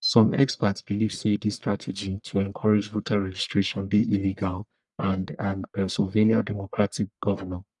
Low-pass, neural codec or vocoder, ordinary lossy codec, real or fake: 10.8 kHz; codec, 44.1 kHz, 1.7 kbps, Pupu-Codec; none; fake